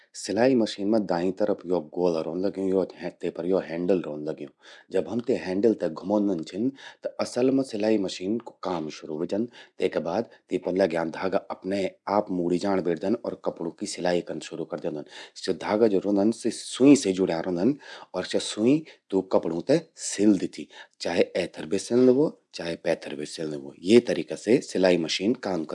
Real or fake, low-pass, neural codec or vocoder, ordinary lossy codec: real; 10.8 kHz; none; none